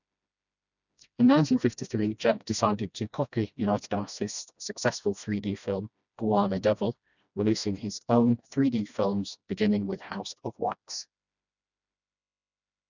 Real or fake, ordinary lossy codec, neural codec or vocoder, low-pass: fake; none; codec, 16 kHz, 1 kbps, FreqCodec, smaller model; 7.2 kHz